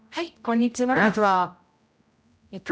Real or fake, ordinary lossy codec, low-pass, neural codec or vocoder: fake; none; none; codec, 16 kHz, 0.5 kbps, X-Codec, HuBERT features, trained on general audio